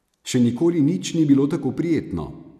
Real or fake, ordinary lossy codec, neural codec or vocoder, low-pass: real; none; none; 14.4 kHz